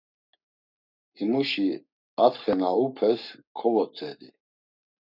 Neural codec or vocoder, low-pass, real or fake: codec, 44.1 kHz, 7.8 kbps, Pupu-Codec; 5.4 kHz; fake